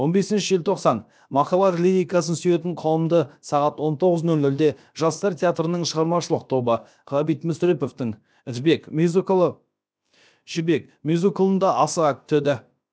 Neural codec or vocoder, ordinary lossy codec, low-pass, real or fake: codec, 16 kHz, about 1 kbps, DyCAST, with the encoder's durations; none; none; fake